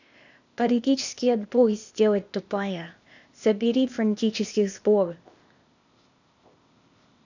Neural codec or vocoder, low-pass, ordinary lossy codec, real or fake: codec, 16 kHz, 0.8 kbps, ZipCodec; 7.2 kHz; none; fake